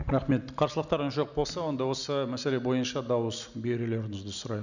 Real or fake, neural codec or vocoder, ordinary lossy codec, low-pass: real; none; none; 7.2 kHz